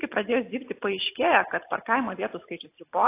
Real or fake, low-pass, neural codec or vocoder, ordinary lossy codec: real; 3.6 kHz; none; AAC, 24 kbps